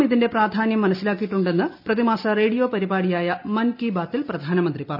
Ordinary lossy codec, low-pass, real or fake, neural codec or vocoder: none; 5.4 kHz; real; none